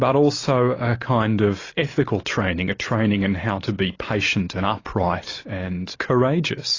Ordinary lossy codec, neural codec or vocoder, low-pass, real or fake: AAC, 32 kbps; none; 7.2 kHz; real